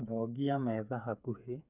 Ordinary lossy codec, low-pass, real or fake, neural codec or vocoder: none; 3.6 kHz; fake; codec, 16 kHz, 8 kbps, FreqCodec, smaller model